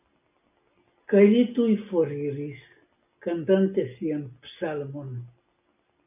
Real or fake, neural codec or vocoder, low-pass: real; none; 3.6 kHz